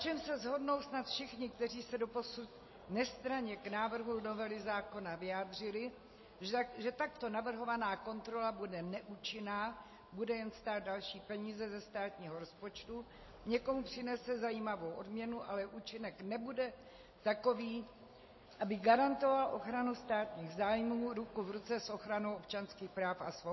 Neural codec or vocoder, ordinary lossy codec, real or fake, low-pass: none; MP3, 24 kbps; real; 7.2 kHz